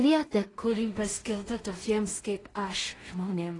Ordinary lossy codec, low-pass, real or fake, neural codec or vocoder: AAC, 32 kbps; 10.8 kHz; fake; codec, 16 kHz in and 24 kHz out, 0.4 kbps, LongCat-Audio-Codec, two codebook decoder